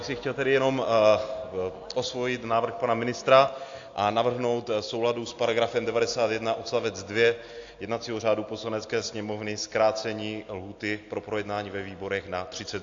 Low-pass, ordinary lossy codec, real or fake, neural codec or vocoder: 7.2 kHz; AAC, 48 kbps; real; none